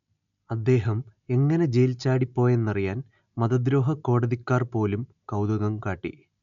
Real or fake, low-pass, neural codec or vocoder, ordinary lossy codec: real; 7.2 kHz; none; none